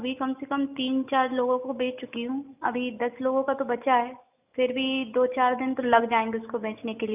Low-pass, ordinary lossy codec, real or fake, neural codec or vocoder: 3.6 kHz; none; real; none